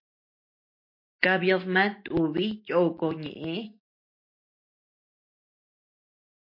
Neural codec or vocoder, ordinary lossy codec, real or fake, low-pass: none; MP3, 32 kbps; real; 5.4 kHz